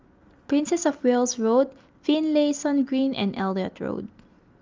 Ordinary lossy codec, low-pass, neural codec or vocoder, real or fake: Opus, 32 kbps; 7.2 kHz; none; real